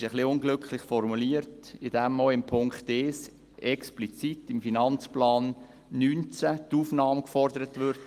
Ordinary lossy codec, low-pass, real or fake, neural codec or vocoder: Opus, 24 kbps; 14.4 kHz; real; none